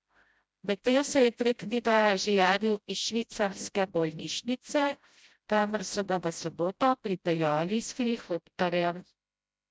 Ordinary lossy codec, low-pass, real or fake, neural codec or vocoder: none; none; fake; codec, 16 kHz, 0.5 kbps, FreqCodec, smaller model